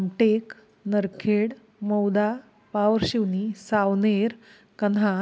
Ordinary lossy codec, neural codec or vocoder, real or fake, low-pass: none; none; real; none